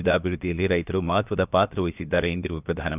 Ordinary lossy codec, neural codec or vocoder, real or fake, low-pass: none; codec, 16 kHz, about 1 kbps, DyCAST, with the encoder's durations; fake; 3.6 kHz